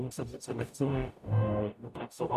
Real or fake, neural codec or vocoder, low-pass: fake; codec, 44.1 kHz, 0.9 kbps, DAC; 14.4 kHz